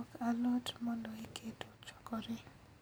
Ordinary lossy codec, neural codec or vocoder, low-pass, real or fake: none; none; none; real